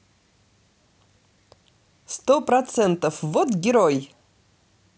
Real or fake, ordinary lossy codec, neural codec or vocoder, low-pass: real; none; none; none